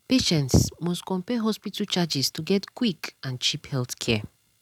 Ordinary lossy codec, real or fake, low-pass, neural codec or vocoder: none; real; 19.8 kHz; none